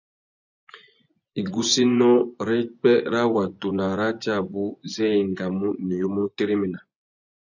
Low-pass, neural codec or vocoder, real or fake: 7.2 kHz; vocoder, 24 kHz, 100 mel bands, Vocos; fake